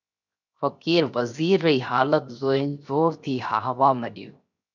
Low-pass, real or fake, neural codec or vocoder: 7.2 kHz; fake; codec, 16 kHz, 0.7 kbps, FocalCodec